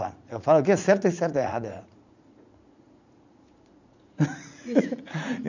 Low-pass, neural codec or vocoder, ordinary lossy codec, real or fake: 7.2 kHz; vocoder, 44.1 kHz, 80 mel bands, Vocos; none; fake